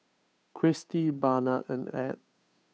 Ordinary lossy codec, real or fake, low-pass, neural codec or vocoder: none; fake; none; codec, 16 kHz, 2 kbps, FunCodec, trained on Chinese and English, 25 frames a second